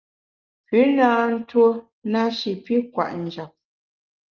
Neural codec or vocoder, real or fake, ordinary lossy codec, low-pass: none; real; Opus, 32 kbps; 7.2 kHz